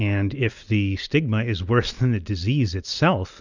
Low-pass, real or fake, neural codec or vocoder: 7.2 kHz; real; none